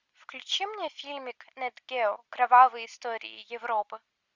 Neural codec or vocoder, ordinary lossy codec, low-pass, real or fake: none; Opus, 64 kbps; 7.2 kHz; real